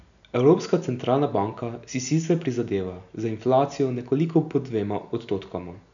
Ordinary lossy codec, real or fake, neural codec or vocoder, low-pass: none; real; none; 7.2 kHz